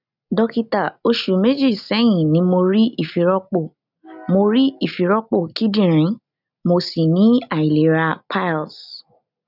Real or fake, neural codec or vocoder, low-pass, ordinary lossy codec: real; none; 5.4 kHz; none